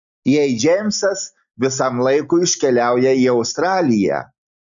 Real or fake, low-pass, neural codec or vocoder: real; 7.2 kHz; none